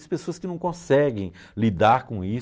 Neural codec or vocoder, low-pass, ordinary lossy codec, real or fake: none; none; none; real